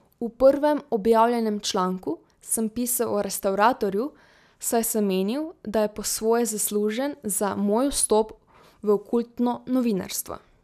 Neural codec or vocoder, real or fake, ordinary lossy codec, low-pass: none; real; none; 14.4 kHz